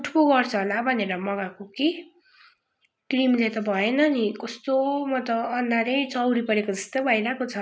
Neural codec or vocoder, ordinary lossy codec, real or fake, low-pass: none; none; real; none